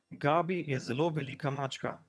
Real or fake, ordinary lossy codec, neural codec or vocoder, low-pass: fake; none; vocoder, 22.05 kHz, 80 mel bands, HiFi-GAN; none